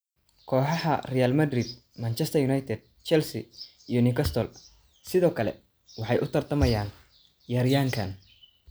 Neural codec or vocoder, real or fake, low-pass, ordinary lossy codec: none; real; none; none